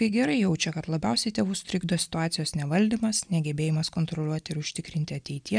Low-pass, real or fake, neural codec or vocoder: 9.9 kHz; real; none